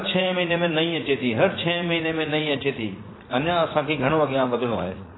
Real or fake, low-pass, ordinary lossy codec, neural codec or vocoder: fake; 7.2 kHz; AAC, 16 kbps; vocoder, 44.1 kHz, 80 mel bands, Vocos